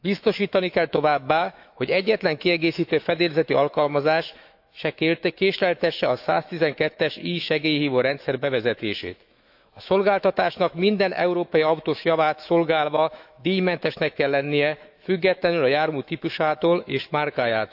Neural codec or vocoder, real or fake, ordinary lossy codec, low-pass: autoencoder, 48 kHz, 128 numbers a frame, DAC-VAE, trained on Japanese speech; fake; AAC, 48 kbps; 5.4 kHz